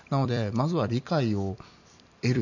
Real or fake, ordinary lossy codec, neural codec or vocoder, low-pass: fake; none; vocoder, 44.1 kHz, 128 mel bands every 256 samples, BigVGAN v2; 7.2 kHz